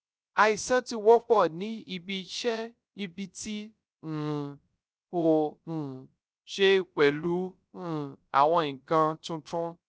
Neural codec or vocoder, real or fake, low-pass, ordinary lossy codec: codec, 16 kHz, 0.3 kbps, FocalCodec; fake; none; none